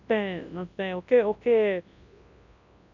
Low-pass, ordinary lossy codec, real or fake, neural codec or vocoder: 7.2 kHz; none; fake; codec, 24 kHz, 0.9 kbps, WavTokenizer, large speech release